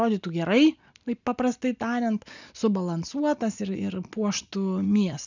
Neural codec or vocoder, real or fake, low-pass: none; real; 7.2 kHz